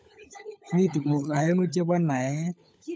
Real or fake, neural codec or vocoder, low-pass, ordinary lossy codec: fake; codec, 16 kHz, 16 kbps, FunCodec, trained on LibriTTS, 50 frames a second; none; none